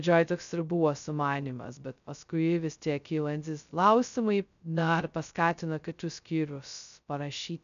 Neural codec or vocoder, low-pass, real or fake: codec, 16 kHz, 0.2 kbps, FocalCodec; 7.2 kHz; fake